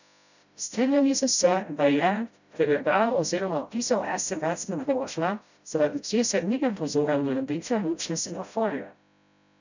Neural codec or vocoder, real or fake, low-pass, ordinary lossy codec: codec, 16 kHz, 0.5 kbps, FreqCodec, smaller model; fake; 7.2 kHz; none